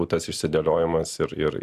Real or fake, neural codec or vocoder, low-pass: real; none; 14.4 kHz